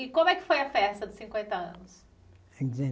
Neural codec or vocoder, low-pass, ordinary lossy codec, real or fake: none; none; none; real